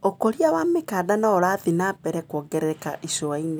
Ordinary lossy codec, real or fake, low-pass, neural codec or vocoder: none; real; none; none